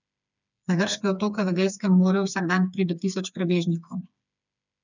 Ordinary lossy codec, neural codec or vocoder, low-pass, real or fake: none; codec, 16 kHz, 4 kbps, FreqCodec, smaller model; 7.2 kHz; fake